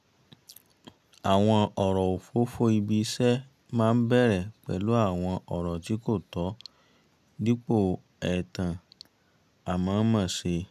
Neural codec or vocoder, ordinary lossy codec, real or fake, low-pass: none; none; real; 14.4 kHz